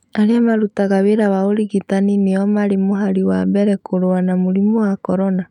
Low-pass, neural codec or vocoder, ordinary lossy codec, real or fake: 19.8 kHz; autoencoder, 48 kHz, 128 numbers a frame, DAC-VAE, trained on Japanese speech; none; fake